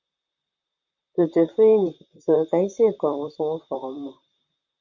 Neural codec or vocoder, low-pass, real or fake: vocoder, 44.1 kHz, 128 mel bands, Pupu-Vocoder; 7.2 kHz; fake